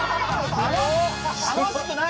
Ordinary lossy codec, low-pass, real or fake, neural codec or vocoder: none; none; real; none